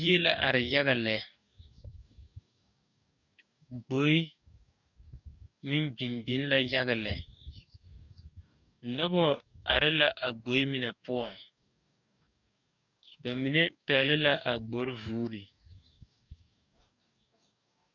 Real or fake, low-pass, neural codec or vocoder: fake; 7.2 kHz; codec, 44.1 kHz, 2.6 kbps, DAC